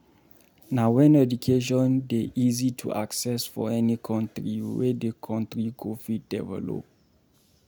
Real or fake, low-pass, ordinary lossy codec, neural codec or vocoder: real; none; none; none